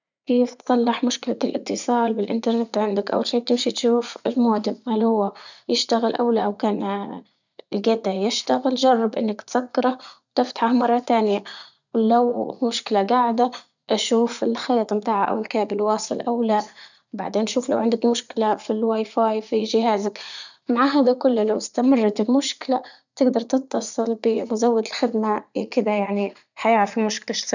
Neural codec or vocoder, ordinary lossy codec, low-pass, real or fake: vocoder, 44.1 kHz, 80 mel bands, Vocos; none; 7.2 kHz; fake